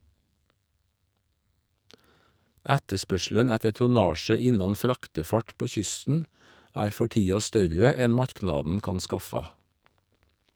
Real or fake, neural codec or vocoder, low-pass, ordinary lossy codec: fake; codec, 44.1 kHz, 2.6 kbps, SNAC; none; none